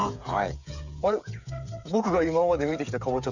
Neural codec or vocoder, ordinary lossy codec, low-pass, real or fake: codec, 16 kHz, 8 kbps, FreqCodec, smaller model; none; 7.2 kHz; fake